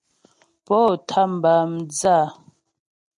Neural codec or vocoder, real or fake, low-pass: none; real; 10.8 kHz